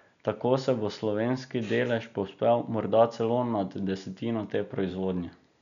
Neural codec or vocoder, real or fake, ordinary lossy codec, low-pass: none; real; none; 7.2 kHz